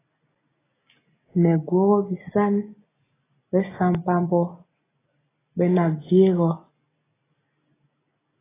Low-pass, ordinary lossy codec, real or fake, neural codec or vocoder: 3.6 kHz; AAC, 16 kbps; real; none